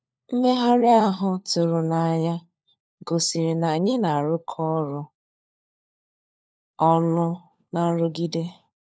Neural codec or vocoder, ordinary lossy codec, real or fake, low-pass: codec, 16 kHz, 4 kbps, FunCodec, trained on LibriTTS, 50 frames a second; none; fake; none